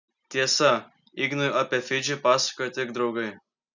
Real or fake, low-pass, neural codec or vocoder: real; 7.2 kHz; none